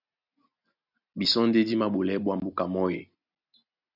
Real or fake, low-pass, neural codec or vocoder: real; 5.4 kHz; none